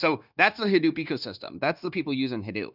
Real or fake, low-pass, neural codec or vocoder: fake; 5.4 kHz; codec, 16 kHz, 0.9 kbps, LongCat-Audio-Codec